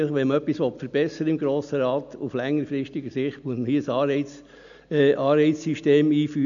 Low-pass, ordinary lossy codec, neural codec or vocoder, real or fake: 7.2 kHz; none; none; real